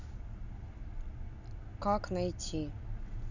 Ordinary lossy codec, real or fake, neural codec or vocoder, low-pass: none; real; none; 7.2 kHz